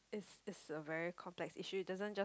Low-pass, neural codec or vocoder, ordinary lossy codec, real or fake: none; none; none; real